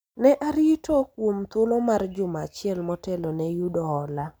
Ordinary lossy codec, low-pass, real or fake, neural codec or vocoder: none; none; real; none